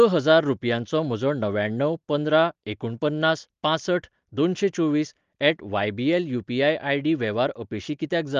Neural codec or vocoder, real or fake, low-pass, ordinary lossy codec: none; real; 7.2 kHz; Opus, 32 kbps